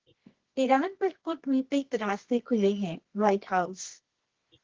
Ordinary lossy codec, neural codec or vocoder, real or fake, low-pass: Opus, 16 kbps; codec, 24 kHz, 0.9 kbps, WavTokenizer, medium music audio release; fake; 7.2 kHz